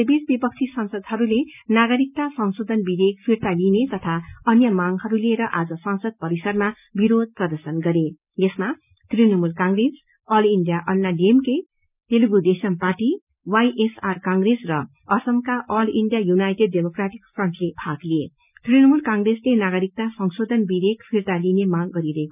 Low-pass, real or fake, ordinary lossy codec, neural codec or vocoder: 3.6 kHz; real; none; none